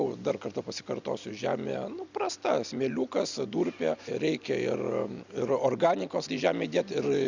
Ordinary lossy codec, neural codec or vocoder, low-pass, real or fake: Opus, 64 kbps; none; 7.2 kHz; real